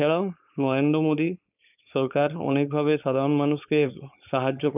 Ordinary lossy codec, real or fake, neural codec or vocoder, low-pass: none; fake; codec, 16 kHz, 4.8 kbps, FACodec; 3.6 kHz